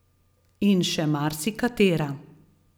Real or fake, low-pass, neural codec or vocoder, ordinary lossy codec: real; none; none; none